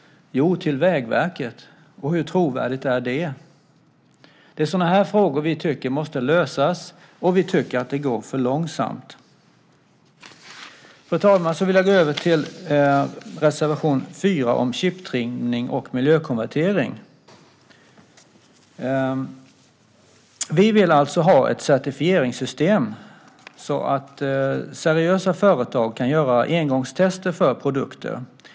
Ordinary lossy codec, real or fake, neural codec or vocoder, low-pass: none; real; none; none